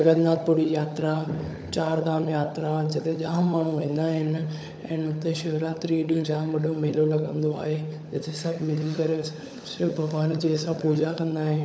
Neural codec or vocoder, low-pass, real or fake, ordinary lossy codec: codec, 16 kHz, 16 kbps, FunCodec, trained on LibriTTS, 50 frames a second; none; fake; none